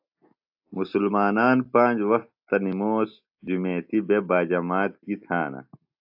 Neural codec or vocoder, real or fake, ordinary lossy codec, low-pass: none; real; AAC, 48 kbps; 5.4 kHz